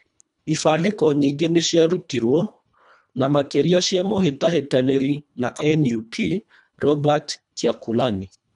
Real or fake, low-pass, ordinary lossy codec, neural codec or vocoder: fake; 10.8 kHz; none; codec, 24 kHz, 1.5 kbps, HILCodec